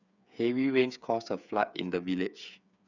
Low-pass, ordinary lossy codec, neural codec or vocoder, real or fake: 7.2 kHz; none; codec, 16 kHz, 8 kbps, FreqCodec, smaller model; fake